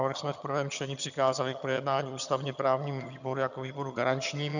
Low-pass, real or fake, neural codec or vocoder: 7.2 kHz; fake; vocoder, 22.05 kHz, 80 mel bands, HiFi-GAN